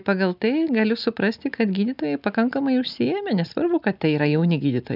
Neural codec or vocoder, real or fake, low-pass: none; real; 5.4 kHz